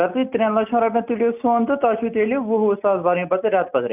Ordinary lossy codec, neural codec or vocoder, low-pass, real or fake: none; none; 3.6 kHz; real